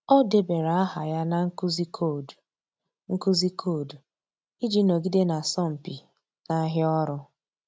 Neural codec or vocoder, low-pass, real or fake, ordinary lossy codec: none; none; real; none